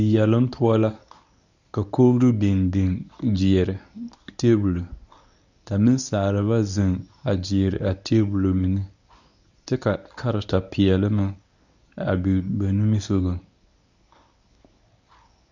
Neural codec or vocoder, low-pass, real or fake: codec, 24 kHz, 0.9 kbps, WavTokenizer, medium speech release version 1; 7.2 kHz; fake